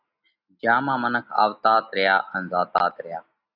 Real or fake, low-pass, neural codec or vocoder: real; 5.4 kHz; none